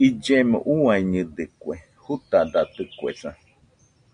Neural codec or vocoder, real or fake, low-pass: none; real; 9.9 kHz